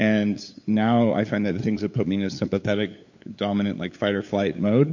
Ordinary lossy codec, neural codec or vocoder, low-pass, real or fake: MP3, 48 kbps; codec, 16 kHz, 8 kbps, FunCodec, trained on LibriTTS, 25 frames a second; 7.2 kHz; fake